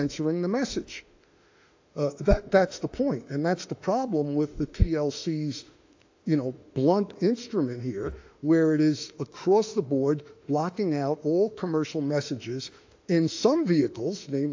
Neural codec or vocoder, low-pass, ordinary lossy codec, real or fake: autoencoder, 48 kHz, 32 numbers a frame, DAC-VAE, trained on Japanese speech; 7.2 kHz; AAC, 48 kbps; fake